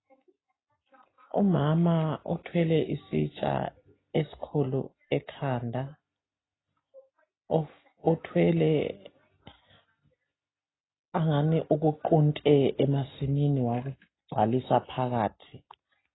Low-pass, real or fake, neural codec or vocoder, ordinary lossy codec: 7.2 kHz; real; none; AAC, 16 kbps